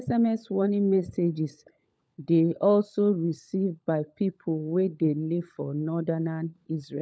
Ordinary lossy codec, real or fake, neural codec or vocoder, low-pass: none; fake; codec, 16 kHz, 16 kbps, FunCodec, trained on LibriTTS, 50 frames a second; none